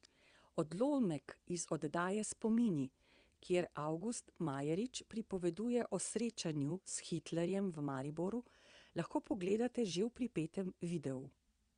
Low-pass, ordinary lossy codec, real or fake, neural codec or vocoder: 9.9 kHz; Opus, 64 kbps; fake; vocoder, 22.05 kHz, 80 mel bands, WaveNeXt